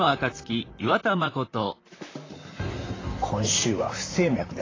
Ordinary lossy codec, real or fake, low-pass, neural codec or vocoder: AAC, 32 kbps; fake; 7.2 kHz; vocoder, 44.1 kHz, 128 mel bands, Pupu-Vocoder